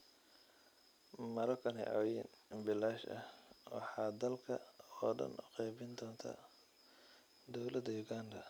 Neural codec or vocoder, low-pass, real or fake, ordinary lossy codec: none; none; real; none